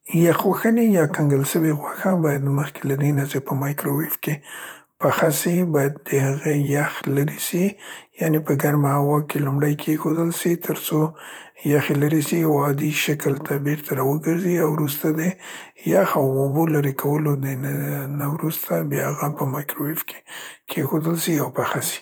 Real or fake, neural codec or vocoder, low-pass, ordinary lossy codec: fake; vocoder, 44.1 kHz, 128 mel bands, Pupu-Vocoder; none; none